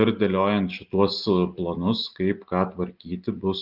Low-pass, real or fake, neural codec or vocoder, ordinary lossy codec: 5.4 kHz; real; none; Opus, 24 kbps